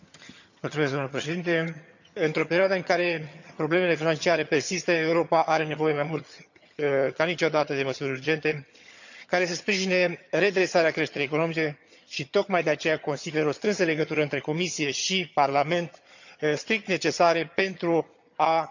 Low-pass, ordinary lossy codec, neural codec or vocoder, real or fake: 7.2 kHz; none; vocoder, 22.05 kHz, 80 mel bands, HiFi-GAN; fake